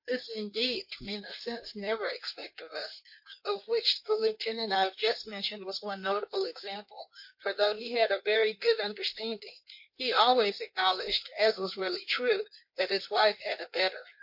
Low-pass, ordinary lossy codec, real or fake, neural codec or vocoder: 5.4 kHz; MP3, 32 kbps; fake; codec, 16 kHz in and 24 kHz out, 1.1 kbps, FireRedTTS-2 codec